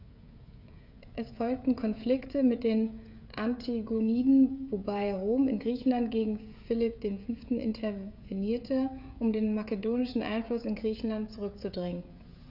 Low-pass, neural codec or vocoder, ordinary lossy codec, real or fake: 5.4 kHz; codec, 16 kHz, 16 kbps, FreqCodec, smaller model; none; fake